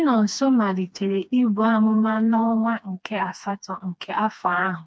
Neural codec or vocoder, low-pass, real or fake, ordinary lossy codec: codec, 16 kHz, 2 kbps, FreqCodec, smaller model; none; fake; none